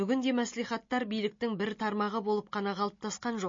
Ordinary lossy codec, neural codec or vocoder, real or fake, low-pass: MP3, 32 kbps; none; real; 7.2 kHz